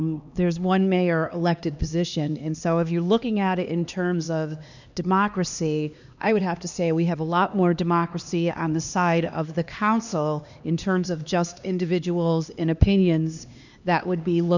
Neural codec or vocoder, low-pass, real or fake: codec, 16 kHz, 2 kbps, X-Codec, HuBERT features, trained on LibriSpeech; 7.2 kHz; fake